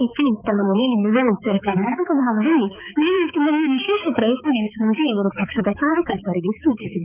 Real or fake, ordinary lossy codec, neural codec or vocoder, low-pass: fake; none; codec, 16 kHz, 4 kbps, X-Codec, HuBERT features, trained on balanced general audio; 3.6 kHz